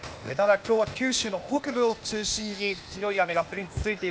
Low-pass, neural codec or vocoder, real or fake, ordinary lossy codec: none; codec, 16 kHz, 0.8 kbps, ZipCodec; fake; none